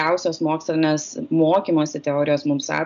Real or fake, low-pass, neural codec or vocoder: real; 7.2 kHz; none